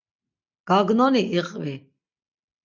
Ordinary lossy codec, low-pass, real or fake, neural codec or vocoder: MP3, 64 kbps; 7.2 kHz; real; none